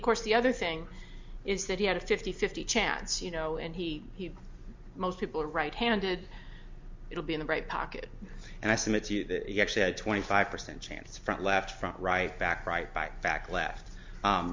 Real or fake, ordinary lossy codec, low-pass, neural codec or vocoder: real; MP3, 64 kbps; 7.2 kHz; none